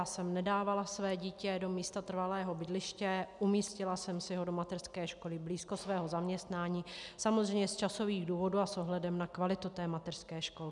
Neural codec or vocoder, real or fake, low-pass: none; real; 10.8 kHz